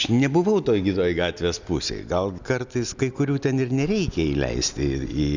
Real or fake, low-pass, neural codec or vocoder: real; 7.2 kHz; none